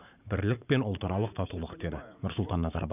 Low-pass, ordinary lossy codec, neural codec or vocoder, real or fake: 3.6 kHz; none; vocoder, 44.1 kHz, 80 mel bands, Vocos; fake